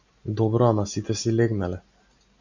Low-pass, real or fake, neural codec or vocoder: 7.2 kHz; real; none